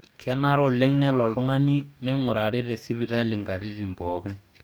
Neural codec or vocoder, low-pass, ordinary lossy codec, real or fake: codec, 44.1 kHz, 2.6 kbps, DAC; none; none; fake